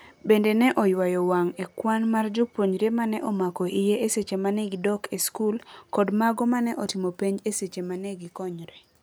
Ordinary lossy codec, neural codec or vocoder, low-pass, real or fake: none; none; none; real